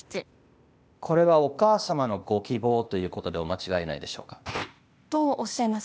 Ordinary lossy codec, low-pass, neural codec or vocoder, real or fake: none; none; codec, 16 kHz, 0.8 kbps, ZipCodec; fake